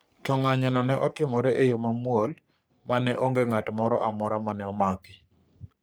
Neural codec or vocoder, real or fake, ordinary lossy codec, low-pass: codec, 44.1 kHz, 3.4 kbps, Pupu-Codec; fake; none; none